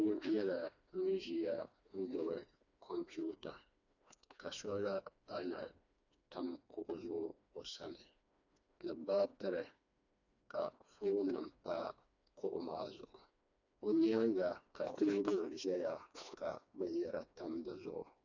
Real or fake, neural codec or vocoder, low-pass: fake; codec, 16 kHz, 2 kbps, FreqCodec, smaller model; 7.2 kHz